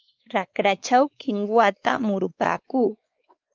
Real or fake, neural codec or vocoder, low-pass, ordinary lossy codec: fake; codec, 16 kHz, 4 kbps, X-Codec, WavLM features, trained on Multilingual LibriSpeech; 7.2 kHz; Opus, 24 kbps